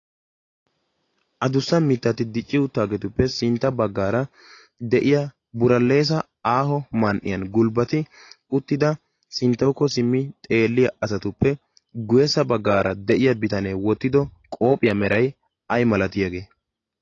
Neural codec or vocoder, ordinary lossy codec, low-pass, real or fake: none; AAC, 32 kbps; 7.2 kHz; real